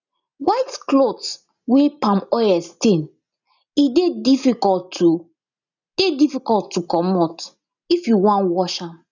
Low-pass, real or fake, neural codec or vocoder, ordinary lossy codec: 7.2 kHz; real; none; none